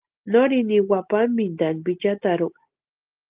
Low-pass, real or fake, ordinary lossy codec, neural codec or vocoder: 3.6 kHz; real; Opus, 32 kbps; none